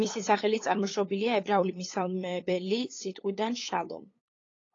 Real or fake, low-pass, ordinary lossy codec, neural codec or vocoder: fake; 7.2 kHz; AAC, 32 kbps; codec, 16 kHz, 8 kbps, FunCodec, trained on LibriTTS, 25 frames a second